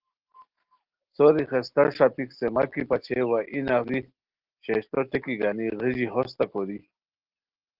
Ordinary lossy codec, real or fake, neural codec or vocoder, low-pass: Opus, 16 kbps; real; none; 5.4 kHz